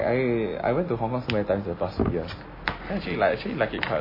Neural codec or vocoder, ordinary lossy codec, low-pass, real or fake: none; MP3, 24 kbps; 5.4 kHz; real